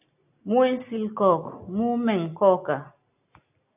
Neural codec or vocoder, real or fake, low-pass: none; real; 3.6 kHz